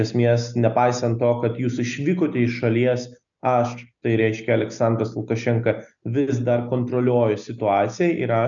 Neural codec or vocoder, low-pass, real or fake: none; 7.2 kHz; real